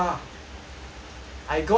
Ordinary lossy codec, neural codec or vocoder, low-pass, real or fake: none; none; none; real